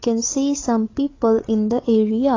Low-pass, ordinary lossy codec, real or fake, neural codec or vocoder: 7.2 kHz; AAC, 32 kbps; fake; codec, 16 kHz, 8 kbps, FreqCodec, larger model